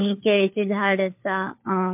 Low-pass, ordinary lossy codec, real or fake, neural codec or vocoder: 3.6 kHz; MP3, 32 kbps; fake; codec, 16 kHz, 4 kbps, FunCodec, trained on LibriTTS, 50 frames a second